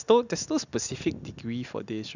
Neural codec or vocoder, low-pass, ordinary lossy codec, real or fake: none; 7.2 kHz; none; real